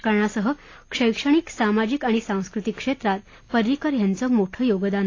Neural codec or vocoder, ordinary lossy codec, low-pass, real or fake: none; AAC, 32 kbps; 7.2 kHz; real